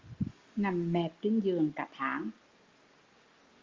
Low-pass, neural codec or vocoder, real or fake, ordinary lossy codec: 7.2 kHz; none; real; Opus, 32 kbps